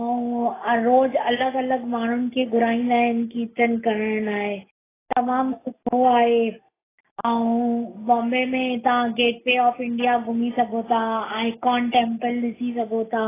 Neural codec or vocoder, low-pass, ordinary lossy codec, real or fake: none; 3.6 kHz; AAC, 16 kbps; real